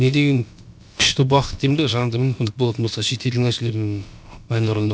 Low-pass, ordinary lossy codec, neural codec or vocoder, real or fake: none; none; codec, 16 kHz, about 1 kbps, DyCAST, with the encoder's durations; fake